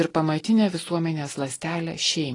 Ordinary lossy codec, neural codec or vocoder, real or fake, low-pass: AAC, 32 kbps; none; real; 10.8 kHz